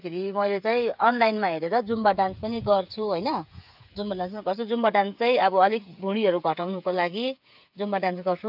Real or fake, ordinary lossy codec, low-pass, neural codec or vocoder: fake; AAC, 48 kbps; 5.4 kHz; codec, 16 kHz, 8 kbps, FreqCodec, smaller model